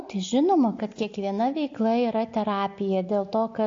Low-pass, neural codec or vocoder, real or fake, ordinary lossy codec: 7.2 kHz; none; real; AAC, 48 kbps